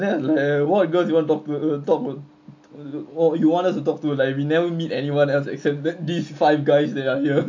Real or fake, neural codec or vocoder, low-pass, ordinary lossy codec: real; none; 7.2 kHz; MP3, 48 kbps